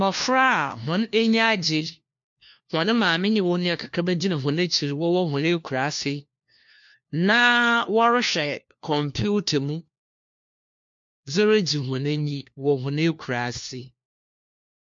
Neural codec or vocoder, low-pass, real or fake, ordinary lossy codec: codec, 16 kHz, 1 kbps, FunCodec, trained on LibriTTS, 50 frames a second; 7.2 kHz; fake; MP3, 48 kbps